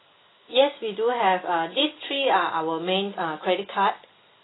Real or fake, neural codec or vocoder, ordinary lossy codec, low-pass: real; none; AAC, 16 kbps; 7.2 kHz